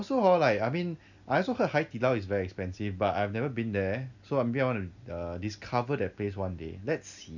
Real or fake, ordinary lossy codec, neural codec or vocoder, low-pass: real; none; none; 7.2 kHz